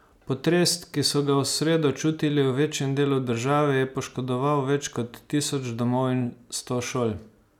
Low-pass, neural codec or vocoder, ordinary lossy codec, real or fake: 19.8 kHz; none; none; real